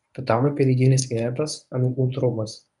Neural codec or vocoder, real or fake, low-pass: codec, 24 kHz, 0.9 kbps, WavTokenizer, medium speech release version 1; fake; 10.8 kHz